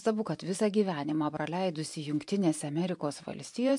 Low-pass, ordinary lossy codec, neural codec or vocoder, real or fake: 10.8 kHz; MP3, 64 kbps; vocoder, 44.1 kHz, 128 mel bands every 512 samples, BigVGAN v2; fake